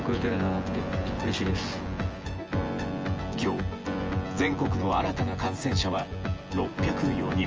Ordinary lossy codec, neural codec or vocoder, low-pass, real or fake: Opus, 24 kbps; vocoder, 24 kHz, 100 mel bands, Vocos; 7.2 kHz; fake